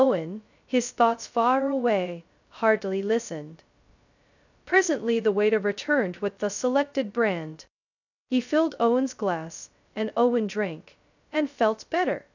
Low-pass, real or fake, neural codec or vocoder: 7.2 kHz; fake; codec, 16 kHz, 0.2 kbps, FocalCodec